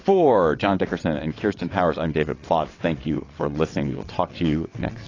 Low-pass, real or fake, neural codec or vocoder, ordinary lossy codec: 7.2 kHz; fake; vocoder, 22.05 kHz, 80 mel bands, WaveNeXt; AAC, 32 kbps